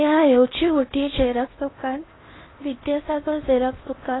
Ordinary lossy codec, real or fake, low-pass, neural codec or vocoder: AAC, 16 kbps; fake; 7.2 kHz; codec, 16 kHz in and 24 kHz out, 0.8 kbps, FocalCodec, streaming, 65536 codes